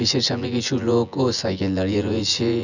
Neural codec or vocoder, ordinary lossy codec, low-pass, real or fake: vocoder, 24 kHz, 100 mel bands, Vocos; none; 7.2 kHz; fake